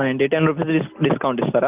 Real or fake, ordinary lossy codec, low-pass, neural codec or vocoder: real; Opus, 24 kbps; 3.6 kHz; none